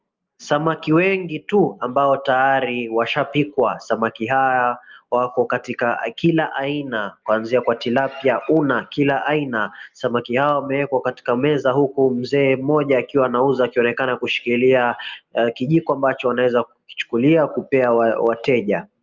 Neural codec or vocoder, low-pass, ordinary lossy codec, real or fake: none; 7.2 kHz; Opus, 24 kbps; real